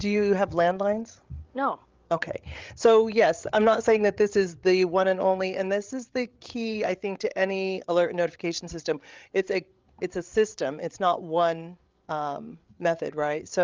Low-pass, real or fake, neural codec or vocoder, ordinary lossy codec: 7.2 kHz; fake; codec, 16 kHz, 8 kbps, FreqCodec, larger model; Opus, 32 kbps